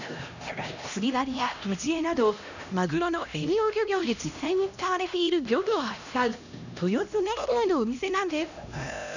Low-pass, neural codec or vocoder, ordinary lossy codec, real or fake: 7.2 kHz; codec, 16 kHz, 1 kbps, X-Codec, HuBERT features, trained on LibriSpeech; none; fake